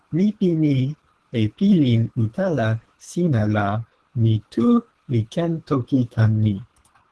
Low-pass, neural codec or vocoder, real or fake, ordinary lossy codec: 10.8 kHz; codec, 24 kHz, 3 kbps, HILCodec; fake; Opus, 16 kbps